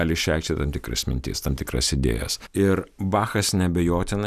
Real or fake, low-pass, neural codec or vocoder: real; 14.4 kHz; none